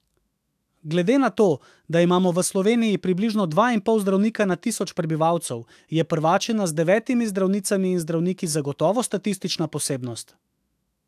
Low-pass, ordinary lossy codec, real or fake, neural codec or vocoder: 14.4 kHz; AAC, 96 kbps; fake; autoencoder, 48 kHz, 128 numbers a frame, DAC-VAE, trained on Japanese speech